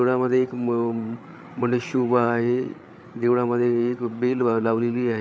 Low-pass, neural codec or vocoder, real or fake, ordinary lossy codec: none; codec, 16 kHz, 8 kbps, FreqCodec, larger model; fake; none